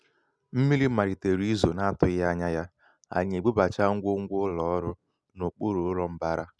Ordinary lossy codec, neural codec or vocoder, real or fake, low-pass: none; none; real; none